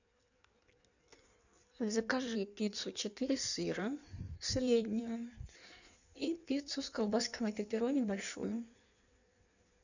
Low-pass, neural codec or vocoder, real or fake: 7.2 kHz; codec, 16 kHz in and 24 kHz out, 1.1 kbps, FireRedTTS-2 codec; fake